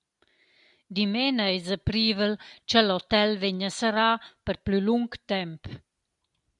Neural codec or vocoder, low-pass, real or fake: none; 10.8 kHz; real